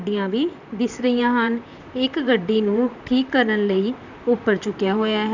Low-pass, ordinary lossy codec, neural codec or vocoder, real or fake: 7.2 kHz; none; vocoder, 44.1 kHz, 128 mel bands, Pupu-Vocoder; fake